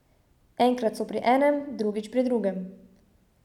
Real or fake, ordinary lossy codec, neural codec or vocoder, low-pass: real; none; none; 19.8 kHz